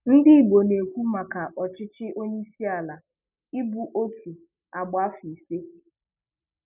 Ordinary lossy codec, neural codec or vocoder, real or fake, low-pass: none; none; real; 3.6 kHz